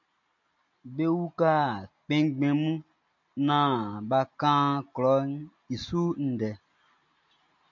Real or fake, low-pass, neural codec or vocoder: real; 7.2 kHz; none